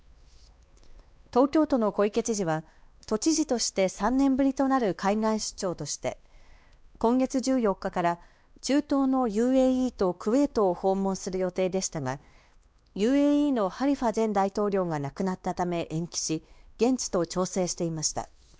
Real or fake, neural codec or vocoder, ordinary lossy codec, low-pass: fake; codec, 16 kHz, 2 kbps, X-Codec, WavLM features, trained on Multilingual LibriSpeech; none; none